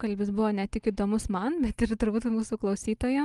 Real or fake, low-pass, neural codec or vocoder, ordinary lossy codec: real; 10.8 kHz; none; Opus, 24 kbps